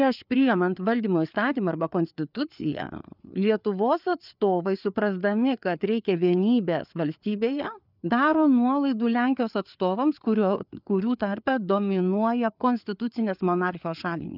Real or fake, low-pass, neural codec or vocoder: fake; 5.4 kHz; codec, 16 kHz, 4 kbps, FreqCodec, larger model